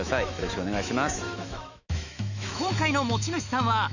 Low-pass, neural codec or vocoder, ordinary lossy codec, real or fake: 7.2 kHz; autoencoder, 48 kHz, 128 numbers a frame, DAC-VAE, trained on Japanese speech; none; fake